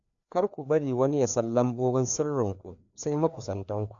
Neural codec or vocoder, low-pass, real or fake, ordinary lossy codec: codec, 16 kHz, 2 kbps, FreqCodec, larger model; 7.2 kHz; fake; none